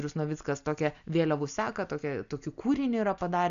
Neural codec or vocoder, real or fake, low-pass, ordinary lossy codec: none; real; 7.2 kHz; AAC, 96 kbps